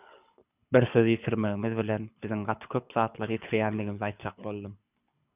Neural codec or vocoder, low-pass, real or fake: codec, 24 kHz, 6 kbps, HILCodec; 3.6 kHz; fake